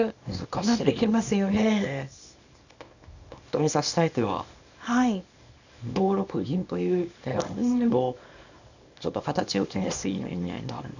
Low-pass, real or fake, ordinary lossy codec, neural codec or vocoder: 7.2 kHz; fake; none; codec, 24 kHz, 0.9 kbps, WavTokenizer, small release